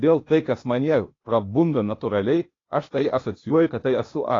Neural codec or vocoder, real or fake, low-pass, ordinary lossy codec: codec, 16 kHz, 0.8 kbps, ZipCodec; fake; 7.2 kHz; AAC, 32 kbps